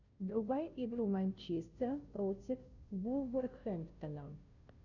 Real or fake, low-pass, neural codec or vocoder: fake; 7.2 kHz; codec, 16 kHz, 0.5 kbps, FunCodec, trained on Chinese and English, 25 frames a second